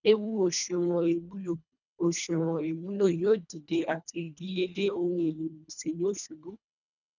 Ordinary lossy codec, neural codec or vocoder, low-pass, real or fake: none; codec, 24 kHz, 1.5 kbps, HILCodec; 7.2 kHz; fake